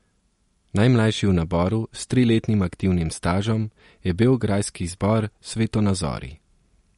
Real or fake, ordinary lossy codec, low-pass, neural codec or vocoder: real; MP3, 48 kbps; 19.8 kHz; none